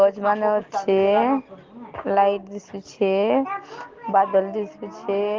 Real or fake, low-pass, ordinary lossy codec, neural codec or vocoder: real; 7.2 kHz; Opus, 16 kbps; none